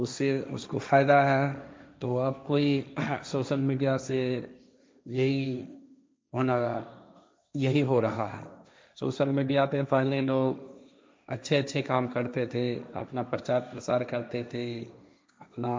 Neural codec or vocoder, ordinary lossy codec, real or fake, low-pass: codec, 16 kHz, 1.1 kbps, Voila-Tokenizer; none; fake; none